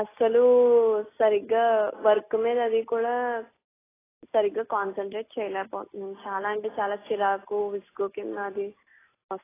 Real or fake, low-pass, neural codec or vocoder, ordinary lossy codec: real; 3.6 kHz; none; AAC, 16 kbps